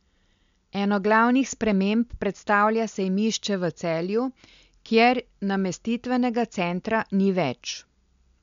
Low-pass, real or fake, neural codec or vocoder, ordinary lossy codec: 7.2 kHz; real; none; MP3, 64 kbps